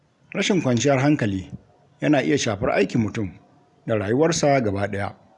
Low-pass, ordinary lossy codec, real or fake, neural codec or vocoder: 10.8 kHz; none; real; none